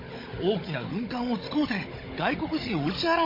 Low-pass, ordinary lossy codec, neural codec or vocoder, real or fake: 5.4 kHz; MP3, 24 kbps; codec, 16 kHz, 16 kbps, FunCodec, trained on Chinese and English, 50 frames a second; fake